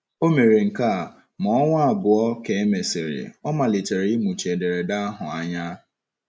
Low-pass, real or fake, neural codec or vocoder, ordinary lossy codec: none; real; none; none